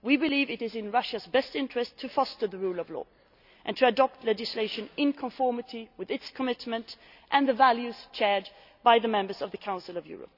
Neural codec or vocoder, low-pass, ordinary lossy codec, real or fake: none; 5.4 kHz; none; real